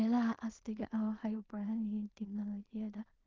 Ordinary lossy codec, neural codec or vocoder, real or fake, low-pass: Opus, 24 kbps; codec, 16 kHz in and 24 kHz out, 0.4 kbps, LongCat-Audio-Codec, two codebook decoder; fake; 7.2 kHz